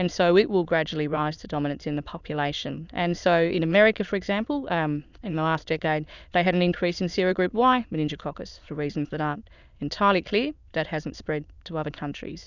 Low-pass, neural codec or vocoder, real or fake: 7.2 kHz; autoencoder, 22.05 kHz, a latent of 192 numbers a frame, VITS, trained on many speakers; fake